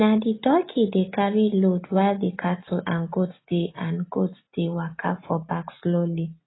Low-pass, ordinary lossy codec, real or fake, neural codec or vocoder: 7.2 kHz; AAC, 16 kbps; real; none